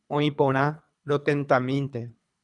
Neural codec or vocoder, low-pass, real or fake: codec, 24 kHz, 3 kbps, HILCodec; 10.8 kHz; fake